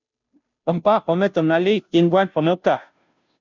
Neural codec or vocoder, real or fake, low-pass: codec, 16 kHz, 0.5 kbps, FunCodec, trained on Chinese and English, 25 frames a second; fake; 7.2 kHz